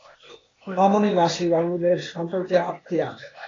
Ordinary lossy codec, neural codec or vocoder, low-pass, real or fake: AAC, 32 kbps; codec, 16 kHz, 0.8 kbps, ZipCodec; 7.2 kHz; fake